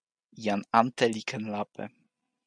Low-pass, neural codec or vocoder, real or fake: 9.9 kHz; none; real